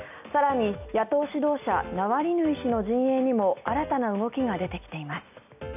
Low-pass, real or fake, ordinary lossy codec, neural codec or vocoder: 3.6 kHz; real; none; none